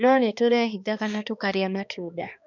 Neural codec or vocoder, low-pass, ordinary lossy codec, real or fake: codec, 16 kHz, 2 kbps, X-Codec, HuBERT features, trained on balanced general audio; 7.2 kHz; none; fake